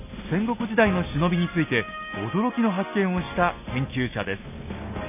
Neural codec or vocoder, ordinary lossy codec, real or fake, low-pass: none; none; real; 3.6 kHz